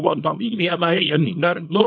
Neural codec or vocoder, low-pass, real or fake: codec, 24 kHz, 0.9 kbps, WavTokenizer, small release; 7.2 kHz; fake